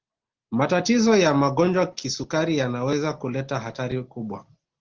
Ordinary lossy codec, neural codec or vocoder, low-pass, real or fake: Opus, 16 kbps; none; 7.2 kHz; real